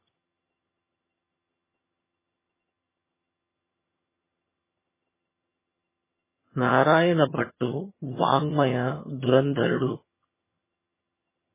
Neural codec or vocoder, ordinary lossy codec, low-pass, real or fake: vocoder, 22.05 kHz, 80 mel bands, HiFi-GAN; MP3, 16 kbps; 3.6 kHz; fake